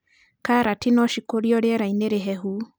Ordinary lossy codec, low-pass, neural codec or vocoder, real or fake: none; none; none; real